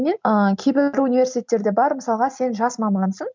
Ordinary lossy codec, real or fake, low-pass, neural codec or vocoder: MP3, 64 kbps; real; 7.2 kHz; none